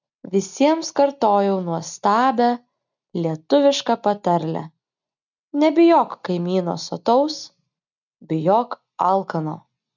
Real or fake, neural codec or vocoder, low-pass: real; none; 7.2 kHz